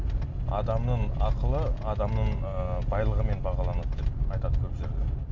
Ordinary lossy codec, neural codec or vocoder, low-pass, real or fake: AAC, 48 kbps; none; 7.2 kHz; real